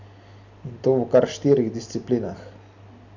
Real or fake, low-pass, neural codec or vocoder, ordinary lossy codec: real; 7.2 kHz; none; none